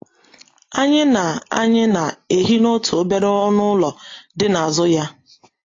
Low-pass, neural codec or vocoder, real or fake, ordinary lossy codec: 7.2 kHz; none; real; AAC, 32 kbps